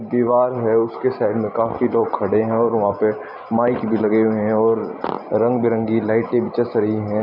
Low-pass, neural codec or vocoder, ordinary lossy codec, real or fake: 5.4 kHz; none; none; real